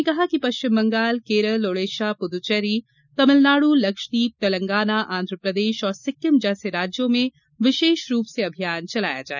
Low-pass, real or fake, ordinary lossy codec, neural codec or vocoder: 7.2 kHz; real; none; none